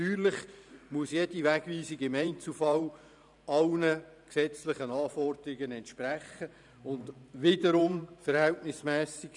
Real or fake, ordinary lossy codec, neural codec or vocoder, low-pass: fake; none; vocoder, 44.1 kHz, 128 mel bands every 512 samples, BigVGAN v2; 10.8 kHz